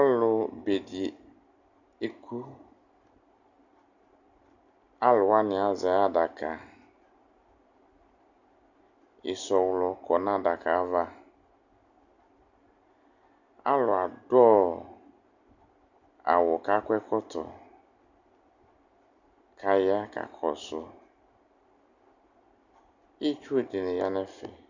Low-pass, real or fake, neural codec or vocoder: 7.2 kHz; real; none